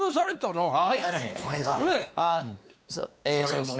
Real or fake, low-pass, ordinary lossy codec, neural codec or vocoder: fake; none; none; codec, 16 kHz, 2 kbps, X-Codec, WavLM features, trained on Multilingual LibriSpeech